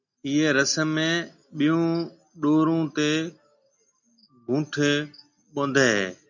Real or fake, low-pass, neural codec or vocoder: real; 7.2 kHz; none